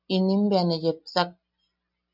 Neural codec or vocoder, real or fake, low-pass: none; real; 5.4 kHz